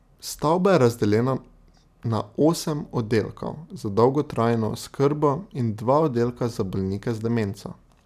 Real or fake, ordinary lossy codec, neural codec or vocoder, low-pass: real; none; none; 14.4 kHz